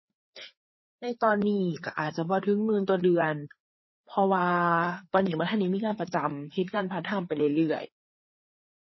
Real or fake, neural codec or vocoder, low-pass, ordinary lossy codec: fake; codec, 16 kHz, 4 kbps, FreqCodec, larger model; 7.2 kHz; MP3, 24 kbps